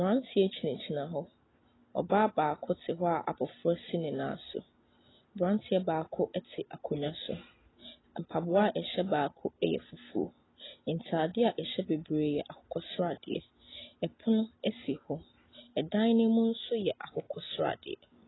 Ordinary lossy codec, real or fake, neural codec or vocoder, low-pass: AAC, 16 kbps; real; none; 7.2 kHz